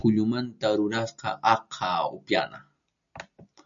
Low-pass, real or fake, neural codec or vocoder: 7.2 kHz; real; none